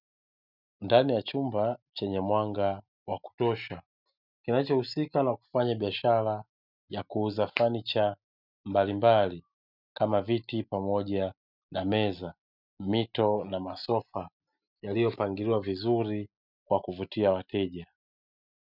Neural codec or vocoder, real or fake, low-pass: none; real; 5.4 kHz